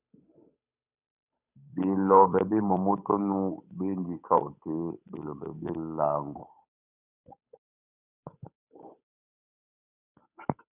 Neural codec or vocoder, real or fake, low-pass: codec, 16 kHz, 8 kbps, FunCodec, trained on Chinese and English, 25 frames a second; fake; 3.6 kHz